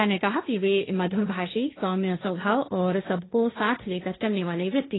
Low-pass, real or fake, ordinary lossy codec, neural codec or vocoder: 7.2 kHz; fake; AAC, 16 kbps; codec, 16 kHz, 1.1 kbps, Voila-Tokenizer